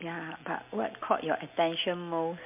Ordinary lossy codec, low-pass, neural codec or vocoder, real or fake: MP3, 32 kbps; 3.6 kHz; none; real